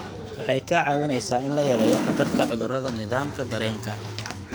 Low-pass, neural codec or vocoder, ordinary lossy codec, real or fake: none; codec, 44.1 kHz, 2.6 kbps, SNAC; none; fake